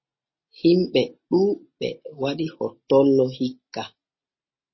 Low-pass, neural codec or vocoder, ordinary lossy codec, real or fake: 7.2 kHz; none; MP3, 24 kbps; real